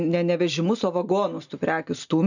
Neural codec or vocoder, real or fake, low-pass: none; real; 7.2 kHz